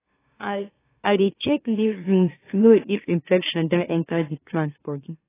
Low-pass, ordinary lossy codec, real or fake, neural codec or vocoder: 3.6 kHz; AAC, 16 kbps; fake; autoencoder, 44.1 kHz, a latent of 192 numbers a frame, MeloTTS